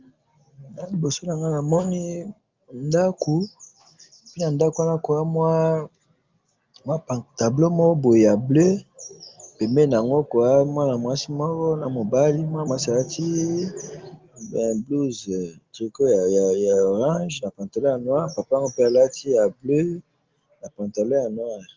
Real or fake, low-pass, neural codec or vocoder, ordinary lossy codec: real; 7.2 kHz; none; Opus, 32 kbps